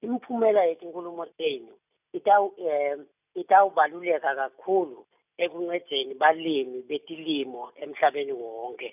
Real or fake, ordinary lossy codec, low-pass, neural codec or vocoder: real; none; 3.6 kHz; none